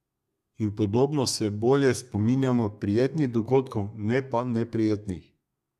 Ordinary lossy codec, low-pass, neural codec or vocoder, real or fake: none; 14.4 kHz; codec, 32 kHz, 1.9 kbps, SNAC; fake